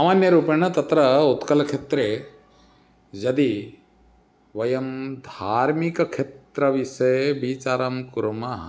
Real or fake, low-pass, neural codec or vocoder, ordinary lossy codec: real; none; none; none